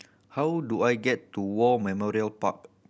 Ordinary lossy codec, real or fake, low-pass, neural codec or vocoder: none; real; none; none